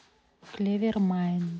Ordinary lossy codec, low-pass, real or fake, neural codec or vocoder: none; none; real; none